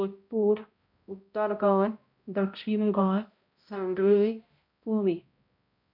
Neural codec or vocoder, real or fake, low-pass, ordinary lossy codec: codec, 16 kHz, 0.5 kbps, X-Codec, HuBERT features, trained on balanced general audio; fake; 5.4 kHz; MP3, 48 kbps